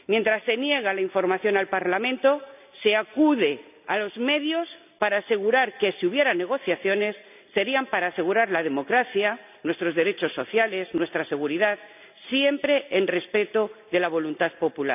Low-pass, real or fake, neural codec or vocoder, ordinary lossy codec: 3.6 kHz; real; none; none